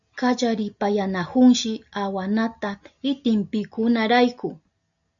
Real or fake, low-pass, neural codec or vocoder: real; 7.2 kHz; none